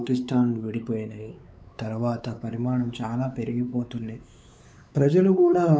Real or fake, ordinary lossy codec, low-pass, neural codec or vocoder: fake; none; none; codec, 16 kHz, 4 kbps, X-Codec, WavLM features, trained on Multilingual LibriSpeech